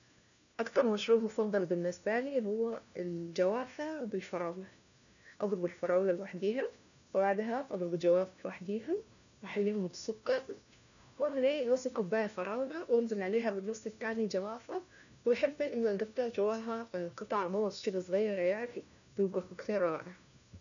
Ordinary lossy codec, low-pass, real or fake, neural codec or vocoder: none; 7.2 kHz; fake; codec, 16 kHz, 1 kbps, FunCodec, trained on LibriTTS, 50 frames a second